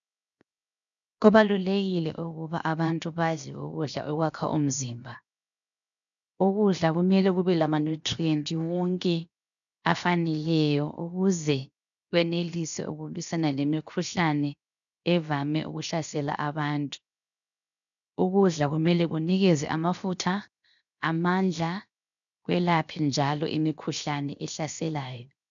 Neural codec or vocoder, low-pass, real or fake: codec, 16 kHz, 0.7 kbps, FocalCodec; 7.2 kHz; fake